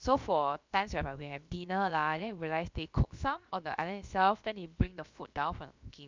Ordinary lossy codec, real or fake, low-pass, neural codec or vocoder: MP3, 64 kbps; fake; 7.2 kHz; codec, 16 kHz, about 1 kbps, DyCAST, with the encoder's durations